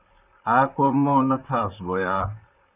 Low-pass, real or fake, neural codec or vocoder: 3.6 kHz; fake; vocoder, 44.1 kHz, 128 mel bands, Pupu-Vocoder